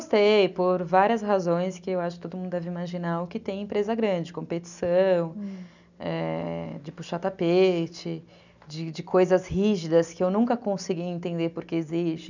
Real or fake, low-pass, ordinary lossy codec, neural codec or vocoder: real; 7.2 kHz; none; none